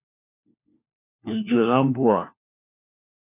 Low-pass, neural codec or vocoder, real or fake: 3.6 kHz; codec, 16 kHz, 1 kbps, FunCodec, trained on LibriTTS, 50 frames a second; fake